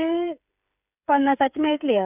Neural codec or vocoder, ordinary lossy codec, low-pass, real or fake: codec, 16 kHz, 8 kbps, FreqCodec, smaller model; none; 3.6 kHz; fake